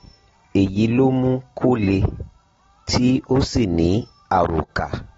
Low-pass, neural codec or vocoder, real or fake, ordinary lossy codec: 7.2 kHz; none; real; AAC, 24 kbps